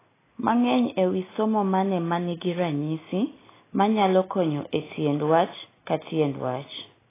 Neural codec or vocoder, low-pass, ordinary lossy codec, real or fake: none; 3.6 kHz; AAC, 16 kbps; real